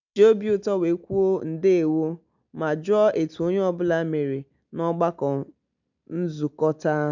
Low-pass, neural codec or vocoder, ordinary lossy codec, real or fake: 7.2 kHz; none; none; real